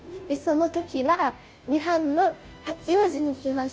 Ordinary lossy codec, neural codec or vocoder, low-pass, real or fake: none; codec, 16 kHz, 0.5 kbps, FunCodec, trained on Chinese and English, 25 frames a second; none; fake